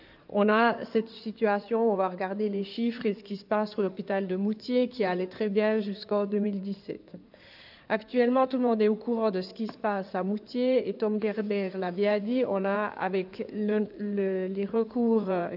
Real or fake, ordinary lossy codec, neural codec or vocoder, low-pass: fake; none; codec, 16 kHz in and 24 kHz out, 2.2 kbps, FireRedTTS-2 codec; 5.4 kHz